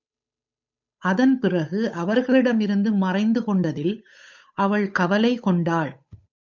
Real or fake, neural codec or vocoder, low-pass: fake; codec, 16 kHz, 8 kbps, FunCodec, trained on Chinese and English, 25 frames a second; 7.2 kHz